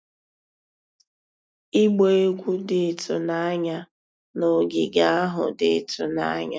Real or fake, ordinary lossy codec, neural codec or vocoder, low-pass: real; none; none; none